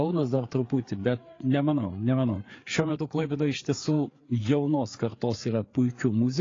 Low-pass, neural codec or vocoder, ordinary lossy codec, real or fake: 7.2 kHz; codec, 16 kHz, 4 kbps, FreqCodec, larger model; AAC, 32 kbps; fake